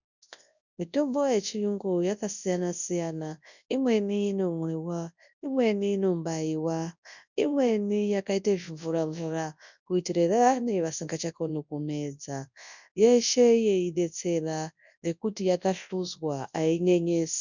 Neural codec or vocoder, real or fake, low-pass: codec, 24 kHz, 0.9 kbps, WavTokenizer, large speech release; fake; 7.2 kHz